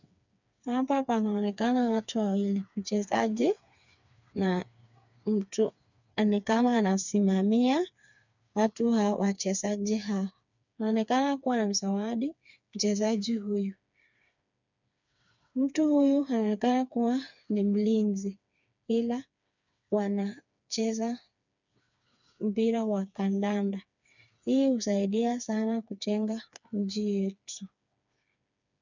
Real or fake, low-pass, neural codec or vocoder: fake; 7.2 kHz; codec, 16 kHz, 4 kbps, FreqCodec, smaller model